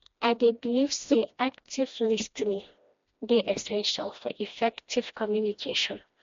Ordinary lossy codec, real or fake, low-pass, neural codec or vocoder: MP3, 48 kbps; fake; 7.2 kHz; codec, 16 kHz, 1 kbps, FreqCodec, smaller model